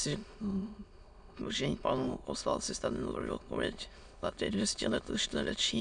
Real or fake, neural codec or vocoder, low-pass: fake; autoencoder, 22.05 kHz, a latent of 192 numbers a frame, VITS, trained on many speakers; 9.9 kHz